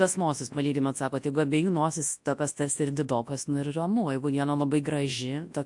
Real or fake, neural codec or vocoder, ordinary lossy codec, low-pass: fake; codec, 24 kHz, 0.9 kbps, WavTokenizer, large speech release; AAC, 64 kbps; 10.8 kHz